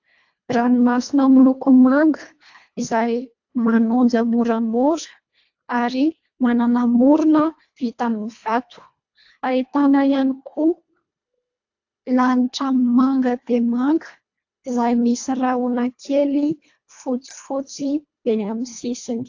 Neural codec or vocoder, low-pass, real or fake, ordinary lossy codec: codec, 24 kHz, 1.5 kbps, HILCodec; 7.2 kHz; fake; AAC, 48 kbps